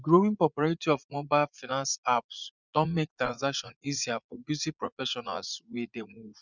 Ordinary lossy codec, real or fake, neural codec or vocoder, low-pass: none; fake; vocoder, 44.1 kHz, 80 mel bands, Vocos; 7.2 kHz